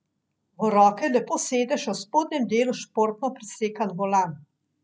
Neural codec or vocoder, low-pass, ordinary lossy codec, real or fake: none; none; none; real